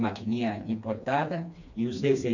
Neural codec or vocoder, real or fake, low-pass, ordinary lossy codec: codec, 16 kHz, 2 kbps, FreqCodec, smaller model; fake; 7.2 kHz; none